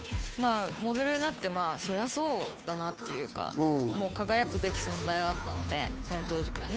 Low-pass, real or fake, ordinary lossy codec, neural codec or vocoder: none; fake; none; codec, 16 kHz, 2 kbps, FunCodec, trained on Chinese and English, 25 frames a second